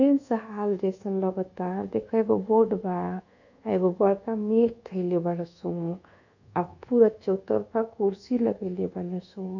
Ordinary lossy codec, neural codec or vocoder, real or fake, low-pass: none; codec, 24 kHz, 1.2 kbps, DualCodec; fake; 7.2 kHz